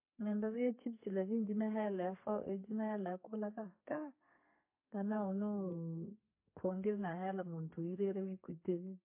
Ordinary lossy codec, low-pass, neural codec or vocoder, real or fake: MP3, 24 kbps; 3.6 kHz; codec, 32 kHz, 1.9 kbps, SNAC; fake